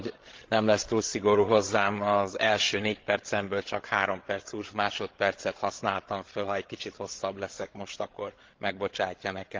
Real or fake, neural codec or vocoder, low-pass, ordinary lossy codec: fake; codec, 16 kHz, 16 kbps, FreqCodec, larger model; 7.2 kHz; Opus, 16 kbps